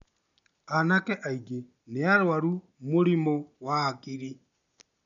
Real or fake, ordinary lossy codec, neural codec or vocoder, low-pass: real; none; none; 7.2 kHz